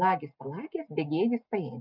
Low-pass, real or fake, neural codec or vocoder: 5.4 kHz; real; none